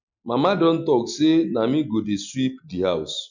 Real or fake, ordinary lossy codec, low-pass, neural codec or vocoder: real; none; 7.2 kHz; none